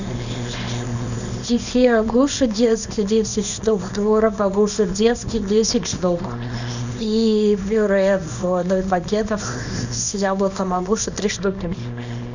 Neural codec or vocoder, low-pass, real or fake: codec, 24 kHz, 0.9 kbps, WavTokenizer, small release; 7.2 kHz; fake